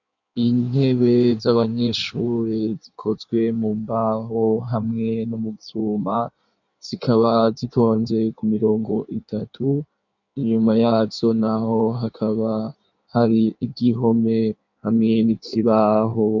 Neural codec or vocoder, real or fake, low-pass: codec, 16 kHz in and 24 kHz out, 1.1 kbps, FireRedTTS-2 codec; fake; 7.2 kHz